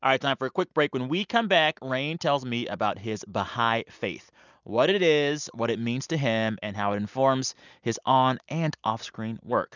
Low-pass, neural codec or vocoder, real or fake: 7.2 kHz; none; real